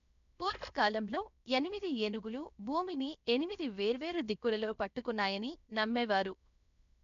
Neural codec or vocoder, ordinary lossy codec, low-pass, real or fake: codec, 16 kHz, 0.7 kbps, FocalCodec; none; 7.2 kHz; fake